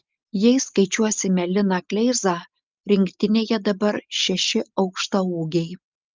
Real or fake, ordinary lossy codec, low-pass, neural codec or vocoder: real; Opus, 24 kbps; 7.2 kHz; none